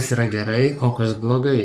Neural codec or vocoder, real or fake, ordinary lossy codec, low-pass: codec, 44.1 kHz, 3.4 kbps, Pupu-Codec; fake; Opus, 64 kbps; 14.4 kHz